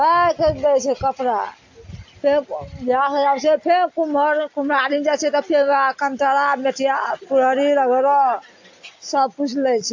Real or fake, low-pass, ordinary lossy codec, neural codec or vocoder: real; 7.2 kHz; AAC, 48 kbps; none